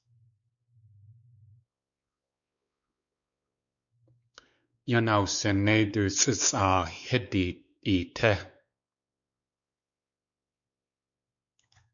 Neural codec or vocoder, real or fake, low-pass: codec, 16 kHz, 4 kbps, X-Codec, WavLM features, trained on Multilingual LibriSpeech; fake; 7.2 kHz